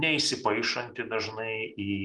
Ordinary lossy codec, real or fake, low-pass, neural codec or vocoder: Opus, 24 kbps; real; 10.8 kHz; none